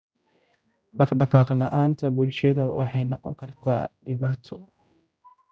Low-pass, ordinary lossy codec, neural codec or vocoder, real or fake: none; none; codec, 16 kHz, 0.5 kbps, X-Codec, HuBERT features, trained on general audio; fake